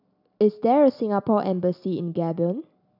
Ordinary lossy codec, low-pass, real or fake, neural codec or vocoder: none; 5.4 kHz; real; none